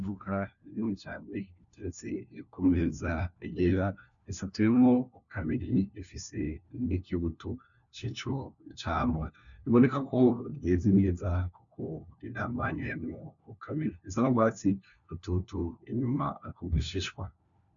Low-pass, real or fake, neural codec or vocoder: 7.2 kHz; fake; codec, 16 kHz, 1 kbps, FunCodec, trained on LibriTTS, 50 frames a second